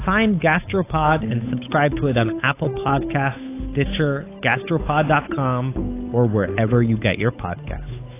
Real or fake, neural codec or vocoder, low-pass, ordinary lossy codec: fake; codec, 16 kHz, 8 kbps, FunCodec, trained on Chinese and English, 25 frames a second; 3.6 kHz; AAC, 24 kbps